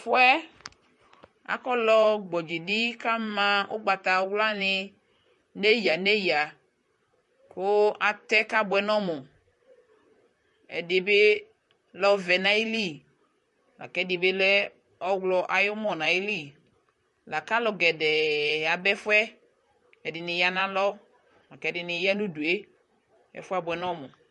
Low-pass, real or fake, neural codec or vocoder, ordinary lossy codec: 14.4 kHz; fake; vocoder, 44.1 kHz, 128 mel bands, Pupu-Vocoder; MP3, 48 kbps